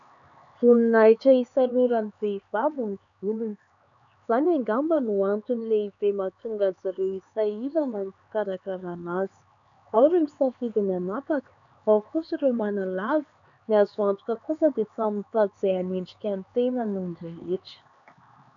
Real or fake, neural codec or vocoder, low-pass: fake; codec, 16 kHz, 4 kbps, X-Codec, HuBERT features, trained on LibriSpeech; 7.2 kHz